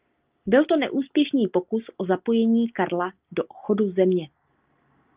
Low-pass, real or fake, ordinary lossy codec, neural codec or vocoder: 3.6 kHz; real; Opus, 24 kbps; none